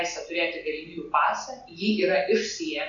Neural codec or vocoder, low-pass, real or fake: none; 7.2 kHz; real